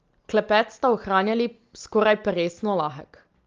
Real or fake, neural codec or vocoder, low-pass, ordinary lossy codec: real; none; 7.2 kHz; Opus, 32 kbps